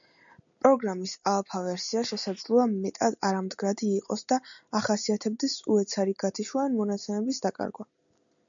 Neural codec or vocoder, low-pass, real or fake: none; 7.2 kHz; real